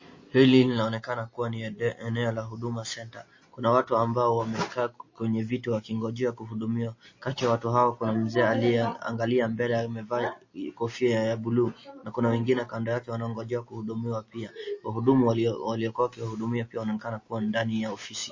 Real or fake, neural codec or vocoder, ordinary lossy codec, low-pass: real; none; MP3, 32 kbps; 7.2 kHz